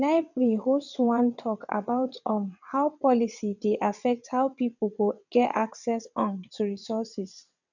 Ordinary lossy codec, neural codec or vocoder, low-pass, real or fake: none; vocoder, 22.05 kHz, 80 mel bands, WaveNeXt; 7.2 kHz; fake